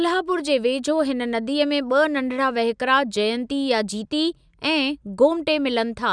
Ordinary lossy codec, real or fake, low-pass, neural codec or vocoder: none; real; 9.9 kHz; none